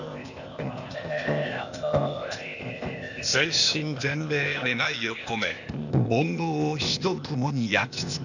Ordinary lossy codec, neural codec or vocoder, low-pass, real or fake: none; codec, 16 kHz, 0.8 kbps, ZipCodec; 7.2 kHz; fake